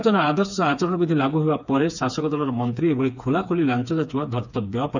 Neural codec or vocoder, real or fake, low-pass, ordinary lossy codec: codec, 16 kHz, 4 kbps, FreqCodec, smaller model; fake; 7.2 kHz; none